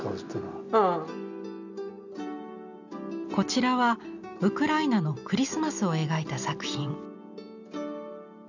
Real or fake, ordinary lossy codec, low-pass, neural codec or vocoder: real; none; 7.2 kHz; none